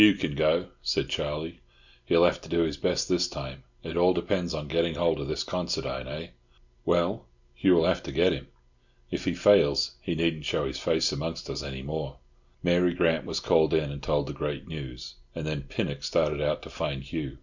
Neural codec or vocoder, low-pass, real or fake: none; 7.2 kHz; real